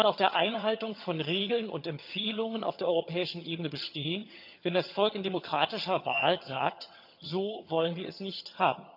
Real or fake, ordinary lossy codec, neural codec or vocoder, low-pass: fake; none; vocoder, 22.05 kHz, 80 mel bands, HiFi-GAN; 5.4 kHz